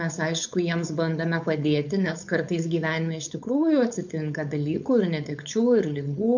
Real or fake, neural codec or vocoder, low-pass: fake; codec, 16 kHz, 4.8 kbps, FACodec; 7.2 kHz